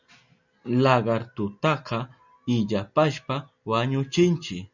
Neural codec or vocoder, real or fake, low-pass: none; real; 7.2 kHz